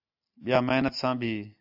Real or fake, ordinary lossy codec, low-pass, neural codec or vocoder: real; AAC, 48 kbps; 5.4 kHz; none